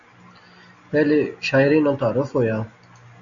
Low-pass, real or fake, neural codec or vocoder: 7.2 kHz; real; none